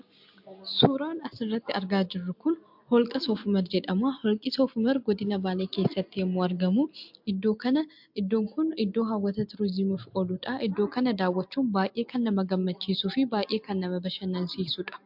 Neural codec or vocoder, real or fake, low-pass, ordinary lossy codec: none; real; 5.4 kHz; MP3, 48 kbps